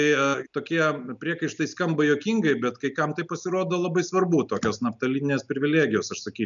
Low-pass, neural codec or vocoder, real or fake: 7.2 kHz; none; real